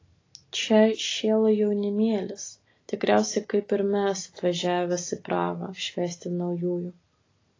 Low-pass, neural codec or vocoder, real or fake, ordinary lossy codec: 7.2 kHz; none; real; AAC, 32 kbps